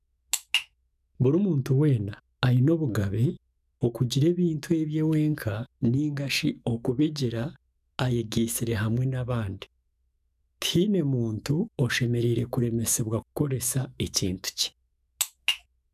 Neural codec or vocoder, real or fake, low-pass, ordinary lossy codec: autoencoder, 48 kHz, 128 numbers a frame, DAC-VAE, trained on Japanese speech; fake; 14.4 kHz; none